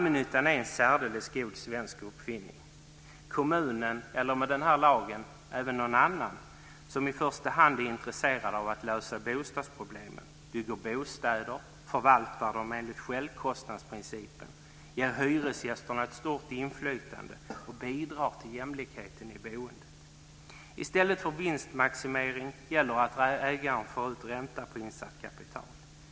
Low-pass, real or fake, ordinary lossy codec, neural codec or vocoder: none; real; none; none